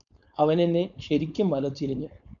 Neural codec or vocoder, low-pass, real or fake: codec, 16 kHz, 4.8 kbps, FACodec; 7.2 kHz; fake